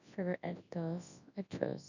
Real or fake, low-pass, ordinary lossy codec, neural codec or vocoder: fake; 7.2 kHz; none; codec, 24 kHz, 0.9 kbps, WavTokenizer, large speech release